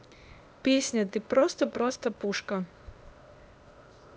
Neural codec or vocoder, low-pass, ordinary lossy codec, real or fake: codec, 16 kHz, 0.8 kbps, ZipCodec; none; none; fake